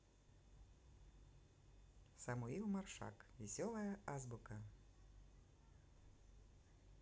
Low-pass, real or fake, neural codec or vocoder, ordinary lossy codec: none; real; none; none